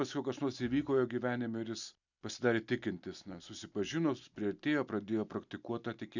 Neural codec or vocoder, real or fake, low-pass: none; real; 7.2 kHz